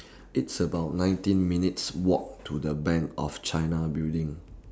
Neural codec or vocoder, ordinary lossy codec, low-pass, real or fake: none; none; none; real